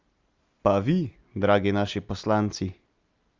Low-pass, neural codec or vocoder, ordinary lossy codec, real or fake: 7.2 kHz; none; Opus, 32 kbps; real